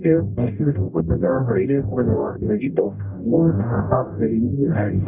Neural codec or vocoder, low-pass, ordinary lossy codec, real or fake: codec, 44.1 kHz, 0.9 kbps, DAC; 3.6 kHz; none; fake